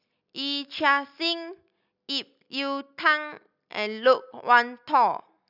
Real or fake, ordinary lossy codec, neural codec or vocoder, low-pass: real; none; none; 5.4 kHz